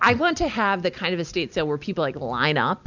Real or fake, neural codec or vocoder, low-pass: real; none; 7.2 kHz